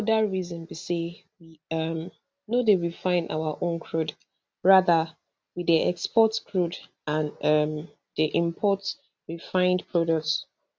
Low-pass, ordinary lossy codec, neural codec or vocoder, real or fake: none; none; none; real